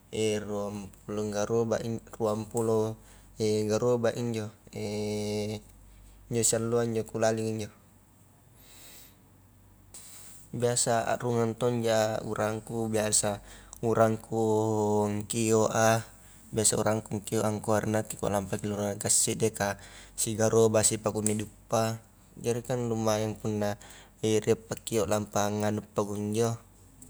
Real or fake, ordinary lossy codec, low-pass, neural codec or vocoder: real; none; none; none